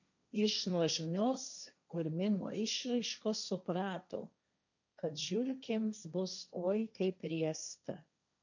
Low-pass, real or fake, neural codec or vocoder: 7.2 kHz; fake; codec, 16 kHz, 1.1 kbps, Voila-Tokenizer